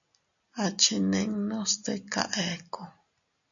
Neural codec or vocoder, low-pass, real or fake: none; 7.2 kHz; real